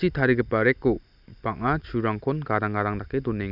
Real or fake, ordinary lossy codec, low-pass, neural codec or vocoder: real; none; 5.4 kHz; none